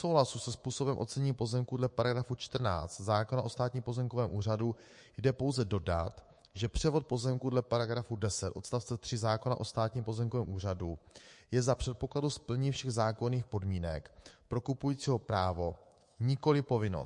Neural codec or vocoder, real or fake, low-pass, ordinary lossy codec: codec, 24 kHz, 3.1 kbps, DualCodec; fake; 10.8 kHz; MP3, 48 kbps